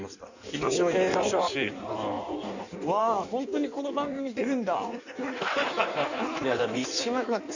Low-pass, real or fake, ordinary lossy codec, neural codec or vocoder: 7.2 kHz; fake; none; codec, 16 kHz in and 24 kHz out, 1.1 kbps, FireRedTTS-2 codec